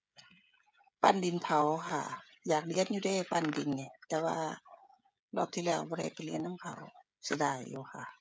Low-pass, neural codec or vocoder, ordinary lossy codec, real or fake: none; codec, 16 kHz, 16 kbps, FreqCodec, smaller model; none; fake